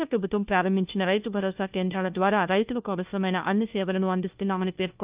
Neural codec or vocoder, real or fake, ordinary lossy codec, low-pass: codec, 16 kHz, 1 kbps, FunCodec, trained on LibriTTS, 50 frames a second; fake; Opus, 24 kbps; 3.6 kHz